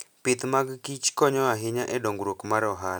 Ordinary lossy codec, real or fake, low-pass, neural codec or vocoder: none; real; none; none